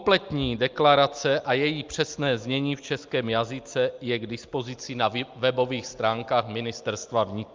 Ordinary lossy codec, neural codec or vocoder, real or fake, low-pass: Opus, 24 kbps; none; real; 7.2 kHz